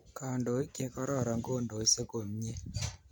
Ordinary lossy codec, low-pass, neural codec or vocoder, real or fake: none; none; none; real